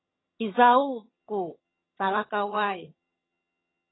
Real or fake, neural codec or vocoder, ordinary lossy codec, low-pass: fake; vocoder, 22.05 kHz, 80 mel bands, HiFi-GAN; AAC, 16 kbps; 7.2 kHz